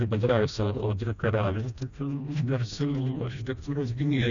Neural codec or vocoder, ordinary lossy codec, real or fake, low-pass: codec, 16 kHz, 1 kbps, FreqCodec, smaller model; MP3, 96 kbps; fake; 7.2 kHz